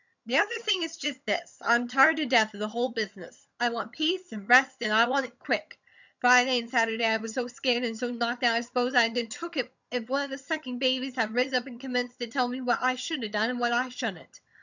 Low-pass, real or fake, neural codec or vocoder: 7.2 kHz; fake; vocoder, 22.05 kHz, 80 mel bands, HiFi-GAN